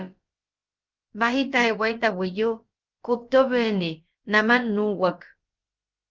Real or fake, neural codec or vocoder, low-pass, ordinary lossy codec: fake; codec, 16 kHz, about 1 kbps, DyCAST, with the encoder's durations; 7.2 kHz; Opus, 24 kbps